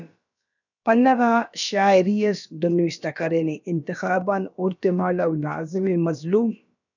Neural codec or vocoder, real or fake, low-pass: codec, 16 kHz, about 1 kbps, DyCAST, with the encoder's durations; fake; 7.2 kHz